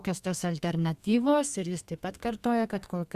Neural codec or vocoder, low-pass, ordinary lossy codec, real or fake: codec, 32 kHz, 1.9 kbps, SNAC; 14.4 kHz; Opus, 64 kbps; fake